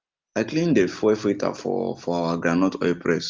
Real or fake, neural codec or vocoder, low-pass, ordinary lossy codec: real; none; 7.2 kHz; Opus, 32 kbps